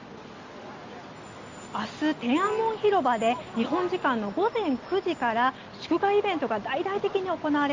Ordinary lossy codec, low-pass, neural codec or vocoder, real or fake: Opus, 32 kbps; 7.2 kHz; none; real